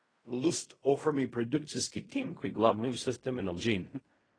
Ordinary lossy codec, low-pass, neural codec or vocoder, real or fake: AAC, 32 kbps; 9.9 kHz; codec, 16 kHz in and 24 kHz out, 0.4 kbps, LongCat-Audio-Codec, fine tuned four codebook decoder; fake